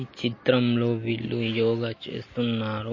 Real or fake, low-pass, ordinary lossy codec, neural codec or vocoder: real; 7.2 kHz; MP3, 32 kbps; none